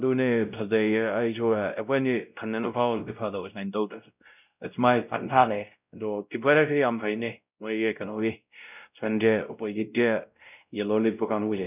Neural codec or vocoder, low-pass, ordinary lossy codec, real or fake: codec, 16 kHz, 0.5 kbps, X-Codec, WavLM features, trained on Multilingual LibriSpeech; 3.6 kHz; none; fake